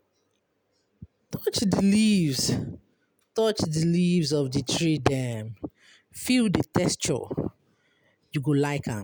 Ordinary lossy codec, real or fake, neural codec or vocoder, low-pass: none; real; none; none